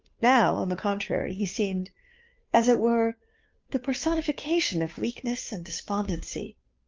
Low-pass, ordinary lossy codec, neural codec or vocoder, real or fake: 7.2 kHz; Opus, 24 kbps; codec, 16 kHz, 2 kbps, FunCodec, trained on Chinese and English, 25 frames a second; fake